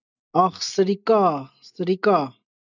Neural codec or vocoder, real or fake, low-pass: none; real; 7.2 kHz